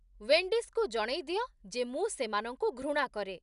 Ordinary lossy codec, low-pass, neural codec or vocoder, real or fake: none; 10.8 kHz; none; real